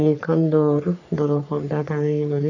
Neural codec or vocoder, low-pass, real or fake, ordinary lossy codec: codec, 44.1 kHz, 3.4 kbps, Pupu-Codec; 7.2 kHz; fake; none